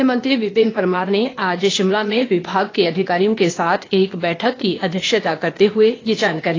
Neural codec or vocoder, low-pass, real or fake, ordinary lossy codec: codec, 16 kHz, 0.8 kbps, ZipCodec; 7.2 kHz; fake; AAC, 32 kbps